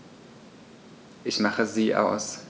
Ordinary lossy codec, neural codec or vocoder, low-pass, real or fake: none; none; none; real